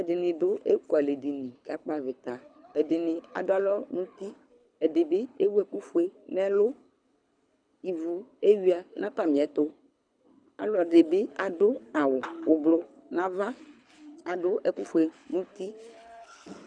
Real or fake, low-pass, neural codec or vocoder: fake; 9.9 kHz; codec, 24 kHz, 6 kbps, HILCodec